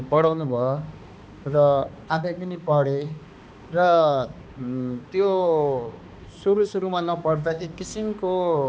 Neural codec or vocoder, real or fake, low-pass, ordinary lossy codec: codec, 16 kHz, 2 kbps, X-Codec, HuBERT features, trained on balanced general audio; fake; none; none